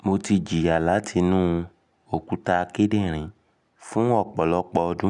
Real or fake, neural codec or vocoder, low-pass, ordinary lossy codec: real; none; 10.8 kHz; none